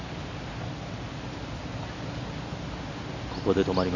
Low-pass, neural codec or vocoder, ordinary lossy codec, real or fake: 7.2 kHz; none; none; real